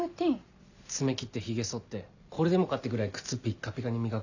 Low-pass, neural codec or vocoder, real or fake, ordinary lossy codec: 7.2 kHz; none; real; none